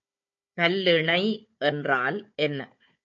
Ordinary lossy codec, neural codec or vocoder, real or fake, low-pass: MP3, 48 kbps; codec, 16 kHz, 4 kbps, FunCodec, trained on Chinese and English, 50 frames a second; fake; 7.2 kHz